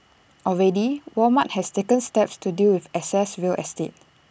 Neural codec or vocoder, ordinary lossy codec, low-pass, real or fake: none; none; none; real